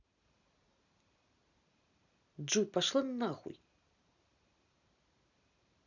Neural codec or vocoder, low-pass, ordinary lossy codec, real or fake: none; 7.2 kHz; none; real